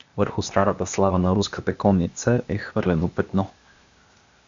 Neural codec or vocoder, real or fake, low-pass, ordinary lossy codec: codec, 16 kHz, 0.8 kbps, ZipCodec; fake; 7.2 kHz; Opus, 64 kbps